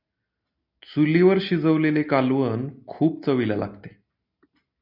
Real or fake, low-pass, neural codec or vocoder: real; 5.4 kHz; none